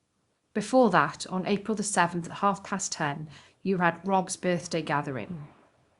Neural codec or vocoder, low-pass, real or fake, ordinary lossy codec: codec, 24 kHz, 0.9 kbps, WavTokenizer, small release; 10.8 kHz; fake; Opus, 64 kbps